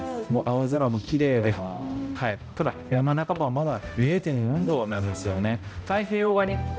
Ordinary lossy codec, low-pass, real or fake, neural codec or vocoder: none; none; fake; codec, 16 kHz, 0.5 kbps, X-Codec, HuBERT features, trained on balanced general audio